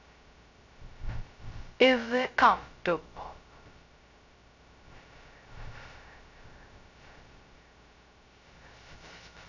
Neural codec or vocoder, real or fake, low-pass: codec, 16 kHz, 0.2 kbps, FocalCodec; fake; 7.2 kHz